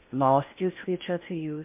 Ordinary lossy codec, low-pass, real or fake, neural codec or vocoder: MP3, 32 kbps; 3.6 kHz; fake; codec, 16 kHz in and 24 kHz out, 0.6 kbps, FocalCodec, streaming, 4096 codes